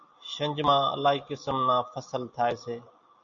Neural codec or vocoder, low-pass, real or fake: none; 7.2 kHz; real